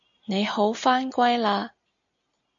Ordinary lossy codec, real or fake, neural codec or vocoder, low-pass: AAC, 64 kbps; real; none; 7.2 kHz